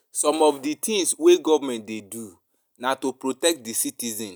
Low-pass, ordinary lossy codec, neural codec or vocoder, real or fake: none; none; none; real